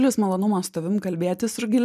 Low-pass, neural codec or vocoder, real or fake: 14.4 kHz; none; real